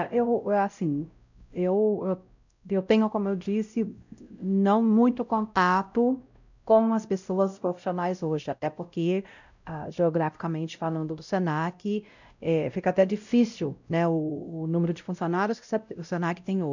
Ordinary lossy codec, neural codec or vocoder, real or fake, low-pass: none; codec, 16 kHz, 0.5 kbps, X-Codec, WavLM features, trained on Multilingual LibriSpeech; fake; 7.2 kHz